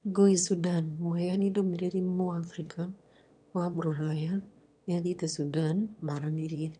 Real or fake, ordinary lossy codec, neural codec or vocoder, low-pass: fake; none; autoencoder, 22.05 kHz, a latent of 192 numbers a frame, VITS, trained on one speaker; 9.9 kHz